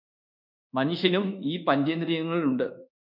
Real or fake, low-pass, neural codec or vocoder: fake; 5.4 kHz; codec, 24 kHz, 1.2 kbps, DualCodec